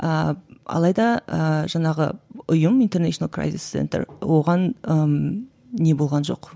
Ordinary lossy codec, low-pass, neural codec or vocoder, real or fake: none; none; none; real